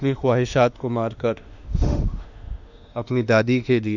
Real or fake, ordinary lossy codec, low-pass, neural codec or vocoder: fake; none; 7.2 kHz; autoencoder, 48 kHz, 32 numbers a frame, DAC-VAE, trained on Japanese speech